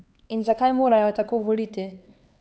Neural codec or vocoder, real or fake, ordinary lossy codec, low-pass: codec, 16 kHz, 4 kbps, X-Codec, HuBERT features, trained on LibriSpeech; fake; none; none